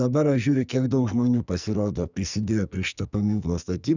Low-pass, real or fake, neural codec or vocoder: 7.2 kHz; fake; codec, 44.1 kHz, 2.6 kbps, SNAC